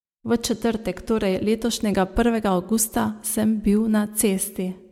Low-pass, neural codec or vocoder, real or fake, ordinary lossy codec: 19.8 kHz; none; real; MP3, 96 kbps